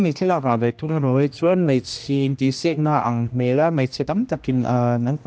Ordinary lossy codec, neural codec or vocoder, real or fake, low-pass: none; codec, 16 kHz, 1 kbps, X-Codec, HuBERT features, trained on general audio; fake; none